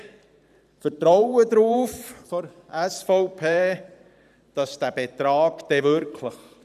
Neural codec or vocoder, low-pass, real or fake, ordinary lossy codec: vocoder, 44.1 kHz, 128 mel bands every 512 samples, BigVGAN v2; 14.4 kHz; fake; none